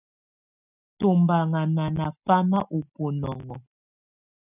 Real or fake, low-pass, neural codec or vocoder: real; 3.6 kHz; none